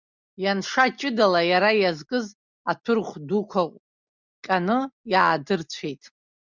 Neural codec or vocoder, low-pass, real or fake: none; 7.2 kHz; real